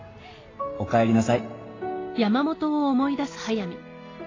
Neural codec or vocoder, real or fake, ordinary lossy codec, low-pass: none; real; AAC, 32 kbps; 7.2 kHz